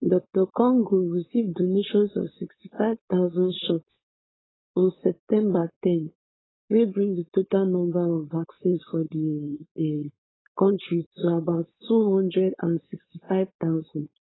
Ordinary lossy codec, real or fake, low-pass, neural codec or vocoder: AAC, 16 kbps; fake; 7.2 kHz; codec, 16 kHz, 4.8 kbps, FACodec